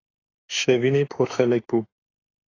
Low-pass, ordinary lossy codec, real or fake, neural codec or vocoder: 7.2 kHz; AAC, 32 kbps; fake; autoencoder, 48 kHz, 32 numbers a frame, DAC-VAE, trained on Japanese speech